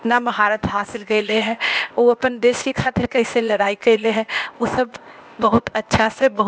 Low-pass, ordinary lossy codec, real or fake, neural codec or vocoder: none; none; fake; codec, 16 kHz, 0.8 kbps, ZipCodec